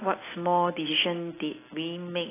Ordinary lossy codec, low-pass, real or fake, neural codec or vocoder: AAC, 16 kbps; 3.6 kHz; real; none